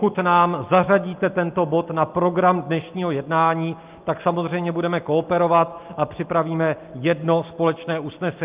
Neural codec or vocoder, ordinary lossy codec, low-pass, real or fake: none; Opus, 32 kbps; 3.6 kHz; real